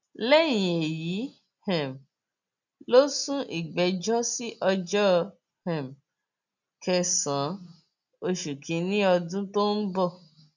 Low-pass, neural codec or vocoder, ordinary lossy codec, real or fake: 7.2 kHz; none; none; real